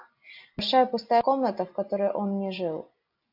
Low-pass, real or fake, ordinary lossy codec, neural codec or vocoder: 5.4 kHz; real; AAC, 48 kbps; none